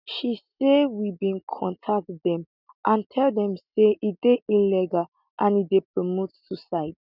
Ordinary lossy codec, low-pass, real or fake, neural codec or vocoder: none; 5.4 kHz; real; none